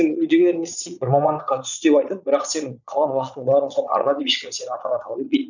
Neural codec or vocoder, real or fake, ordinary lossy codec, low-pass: vocoder, 22.05 kHz, 80 mel bands, Vocos; fake; none; 7.2 kHz